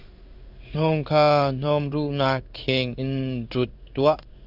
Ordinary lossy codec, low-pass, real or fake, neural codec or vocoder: Opus, 64 kbps; 5.4 kHz; fake; codec, 16 kHz in and 24 kHz out, 1 kbps, XY-Tokenizer